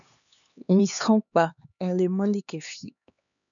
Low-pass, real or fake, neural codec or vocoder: 7.2 kHz; fake; codec, 16 kHz, 2 kbps, X-Codec, HuBERT features, trained on LibriSpeech